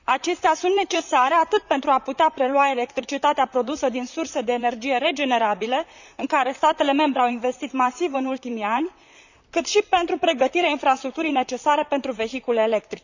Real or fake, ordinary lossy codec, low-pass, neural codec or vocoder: fake; none; 7.2 kHz; vocoder, 44.1 kHz, 128 mel bands, Pupu-Vocoder